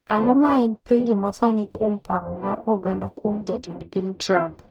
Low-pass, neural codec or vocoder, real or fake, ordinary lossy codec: 19.8 kHz; codec, 44.1 kHz, 0.9 kbps, DAC; fake; none